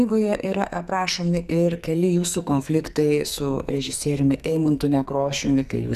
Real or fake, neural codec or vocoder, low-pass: fake; codec, 44.1 kHz, 2.6 kbps, SNAC; 14.4 kHz